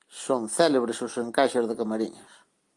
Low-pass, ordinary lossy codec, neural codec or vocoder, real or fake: 10.8 kHz; Opus, 32 kbps; none; real